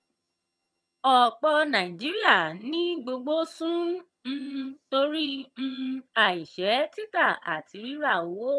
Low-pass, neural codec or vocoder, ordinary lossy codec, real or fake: none; vocoder, 22.05 kHz, 80 mel bands, HiFi-GAN; none; fake